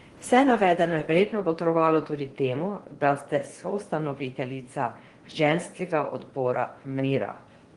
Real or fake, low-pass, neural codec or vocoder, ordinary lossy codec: fake; 10.8 kHz; codec, 16 kHz in and 24 kHz out, 0.8 kbps, FocalCodec, streaming, 65536 codes; Opus, 24 kbps